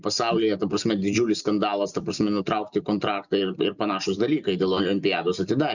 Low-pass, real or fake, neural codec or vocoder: 7.2 kHz; real; none